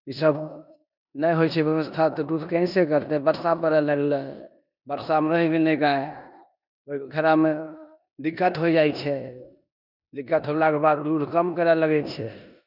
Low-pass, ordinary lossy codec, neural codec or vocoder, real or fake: 5.4 kHz; none; codec, 16 kHz in and 24 kHz out, 0.9 kbps, LongCat-Audio-Codec, four codebook decoder; fake